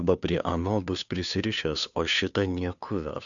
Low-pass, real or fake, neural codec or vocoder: 7.2 kHz; fake; codec, 16 kHz, 2 kbps, FunCodec, trained on Chinese and English, 25 frames a second